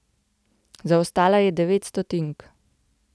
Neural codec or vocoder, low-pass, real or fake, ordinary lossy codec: none; none; real; none